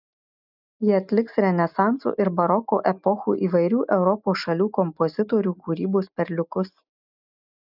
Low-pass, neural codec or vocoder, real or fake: 5.4 kHz; none; real